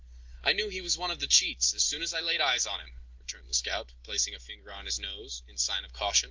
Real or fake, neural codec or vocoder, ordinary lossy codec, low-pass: real; none; Opus, 24 kbps; 7.2 kHz